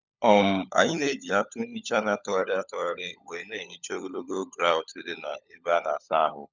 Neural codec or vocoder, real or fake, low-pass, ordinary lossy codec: codec, 16 kHz, 16 kbps, FunCodec, trained on LibriTTS, 50 frames a second; fake; 7.2 kHz; none